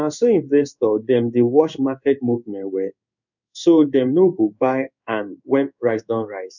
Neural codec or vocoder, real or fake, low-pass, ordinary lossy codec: codec, 16 kHz in and 24 kHz out, 1 kbps, XY-Tokenizer; fake; 7.2 kHz; none